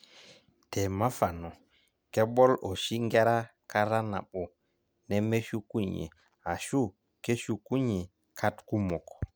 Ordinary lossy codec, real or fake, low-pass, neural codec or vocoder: none; real; none; none